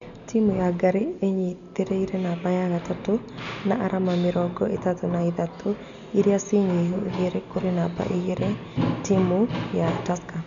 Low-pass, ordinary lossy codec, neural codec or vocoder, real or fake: 7.2 kHz; none; none; real